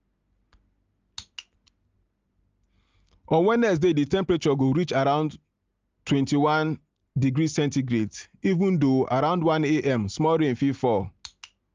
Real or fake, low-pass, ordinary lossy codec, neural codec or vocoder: real; 7.2 kHz; Opus, 24 kbps; none